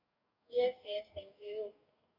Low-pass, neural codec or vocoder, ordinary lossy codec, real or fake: 5.4 kHz; codec, 44.1 kHz, 2.6 kbps, DAC; none; fake